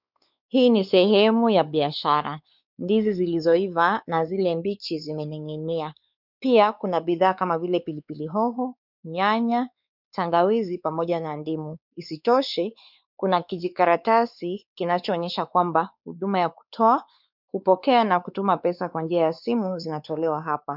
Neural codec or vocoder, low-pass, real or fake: codec, 16 kHz, 4 kbps, X-Codec, WavLM features, trained on Multilingual LibriSpeech; 5.4 kHz; fake